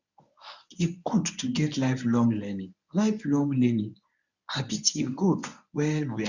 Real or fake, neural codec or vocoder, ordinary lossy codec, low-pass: fake; codec, 24 kHz, 0.9 kbps, WavTokenizer, medium speech release version 1; none; 7.2 kHz